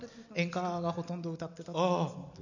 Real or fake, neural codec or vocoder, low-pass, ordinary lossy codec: real; none; 7.2 kHz; none